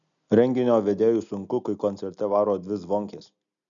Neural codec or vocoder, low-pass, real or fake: none; 7.2 kHz; real